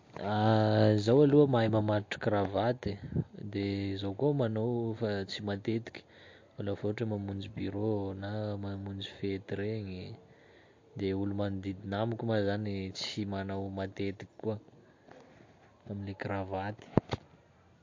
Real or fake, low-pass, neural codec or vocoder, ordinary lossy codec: real; 7.2 kHz; none; MP3, 48 kbps